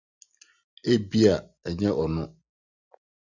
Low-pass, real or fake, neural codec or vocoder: 7.2 kHz; real; none